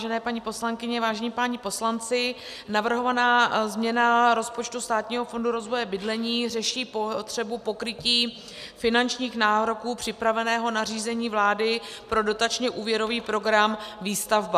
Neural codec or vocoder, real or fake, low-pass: none; real; 14.4 kHz